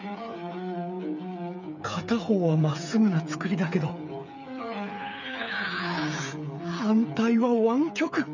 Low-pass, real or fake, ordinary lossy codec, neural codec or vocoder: 7.2 kHz; fake; none; codec, 16 kHz, 8 kbps, FreqCodec, smaller model